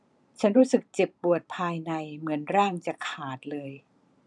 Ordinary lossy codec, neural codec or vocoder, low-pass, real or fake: none; vocoder, 44.1 kHz, 128 mel bands every 512 samples, BigVGAN v2; 10.8 kHz; fake